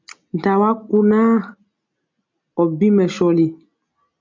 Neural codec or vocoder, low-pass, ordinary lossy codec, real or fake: none; 7.2 kHz; MP3, 64 kbps; real